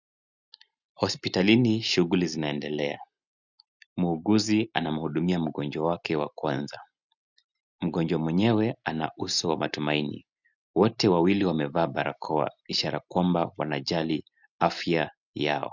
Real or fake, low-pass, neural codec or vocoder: real; 7.2 kHz; none